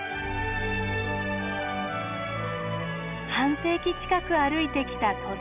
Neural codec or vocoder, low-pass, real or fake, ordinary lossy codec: none; 3.6 kHz; real; none